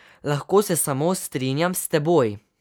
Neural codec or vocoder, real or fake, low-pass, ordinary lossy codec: none; real; none; none